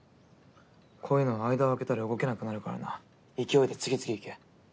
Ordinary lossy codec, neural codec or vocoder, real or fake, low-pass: none; none; real; none